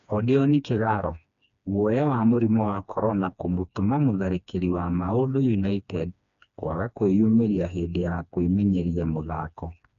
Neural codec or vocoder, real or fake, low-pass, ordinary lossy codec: codec, 16 kHz, 2 kbps, FreqCodec, smaller model; fake; 7.2 kHz; none